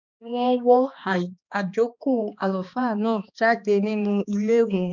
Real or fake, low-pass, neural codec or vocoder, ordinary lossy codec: fake; 7.2 kHz; codec, 16 kHz, 2 kbps, X-Codec, HuBERT features, trained on balanced general audio; none